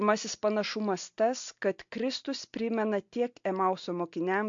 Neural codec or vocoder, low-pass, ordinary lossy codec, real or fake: none; 7.2 kHz; MP3, 48 kbps; real